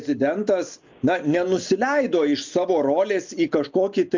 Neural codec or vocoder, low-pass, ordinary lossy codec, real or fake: none; 7.2 kHz; MP3, 64 kbps; real